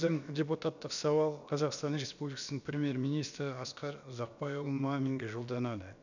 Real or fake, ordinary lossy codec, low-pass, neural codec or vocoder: fake; none; 7.2 kHz; codec, 16 kHz, about 1 kbps, DyCAST, with the encoder's durations